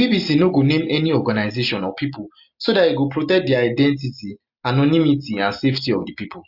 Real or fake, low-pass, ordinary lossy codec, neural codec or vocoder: real; 5.4 kHz; Opus, 64 kbps; none